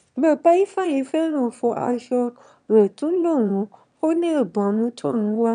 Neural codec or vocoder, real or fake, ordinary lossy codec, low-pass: autoencoder, 22.05 kHz, a latent of 192 numbers a frame, VITS, trained on one speaker; fake; none; 9.9 kHz